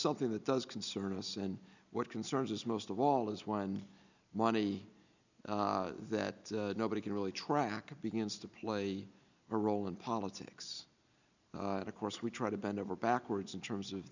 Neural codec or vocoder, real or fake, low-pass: none; real; 7.2 kHz